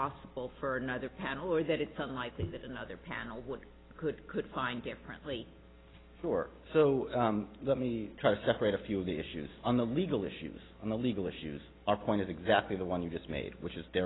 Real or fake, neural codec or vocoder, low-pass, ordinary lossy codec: real; none; 7.2 kHz; AAC, 16 kbps